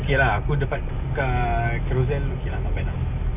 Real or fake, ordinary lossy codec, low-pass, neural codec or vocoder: real; none; 3.6 kHz; none